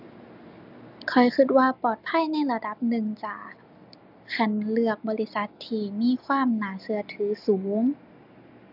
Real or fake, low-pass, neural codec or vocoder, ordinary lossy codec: real; 5.4 kHz; none; none